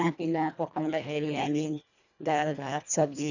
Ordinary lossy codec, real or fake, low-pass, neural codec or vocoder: none; fake; 7.2 kHz; codec, 24 kHz, 1.5 kbps, HILCodec